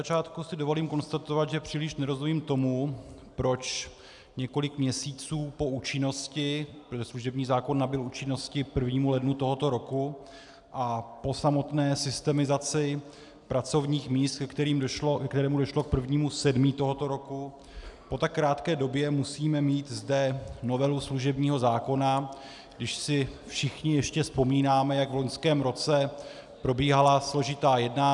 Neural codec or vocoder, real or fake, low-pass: none; real; 10.8 kHz